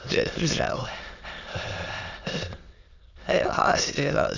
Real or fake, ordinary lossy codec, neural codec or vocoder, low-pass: fake; Opus, 64 kbps; autoencoder, 22.05 kHz, a latent of 192 numbers a frame, VITS, trained on many speakers; 7.2 kHz